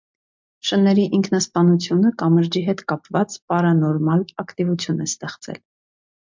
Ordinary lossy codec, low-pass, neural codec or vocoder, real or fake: MP3, 64 kbps; 7.2 kHz; none; real